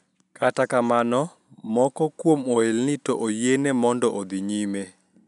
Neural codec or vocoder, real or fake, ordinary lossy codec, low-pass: none; real; none; 10.8 kHz